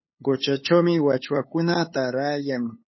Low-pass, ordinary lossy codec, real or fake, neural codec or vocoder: 7.2 kHz; MP3, 24 kbps; fake; codec, 16 kHz, 8 kbps, FunCodec, trained on LibriTTS, 25 frames a second